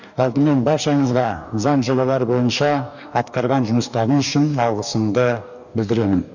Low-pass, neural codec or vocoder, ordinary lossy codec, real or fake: 7.2 kHz; codec, 44.1 kHz, 2.6 kbps, DAC; none; fake